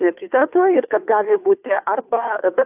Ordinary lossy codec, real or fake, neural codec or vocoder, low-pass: AAC, 32 kbps; fake; codec, 16 kHz, 2 kbps, FunCodec, trained on Chinese and English, 25 frames a second; 3.6 kHz